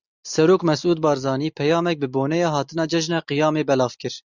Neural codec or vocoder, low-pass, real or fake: none; 7.2 kHz; real